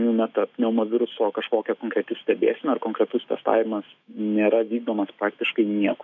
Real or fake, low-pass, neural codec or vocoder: fake; 7.2 kHz; autoencoder, 48 kHz, 128 numbers a frame, DAC-VAE, trained on Japanese speech